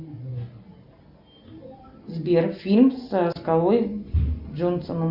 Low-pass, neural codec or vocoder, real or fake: 5.4 kHz; none; real